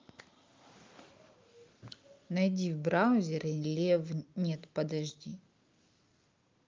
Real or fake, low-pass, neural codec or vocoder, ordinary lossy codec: real; 7.2 kHz; none; Opus, 24 kbps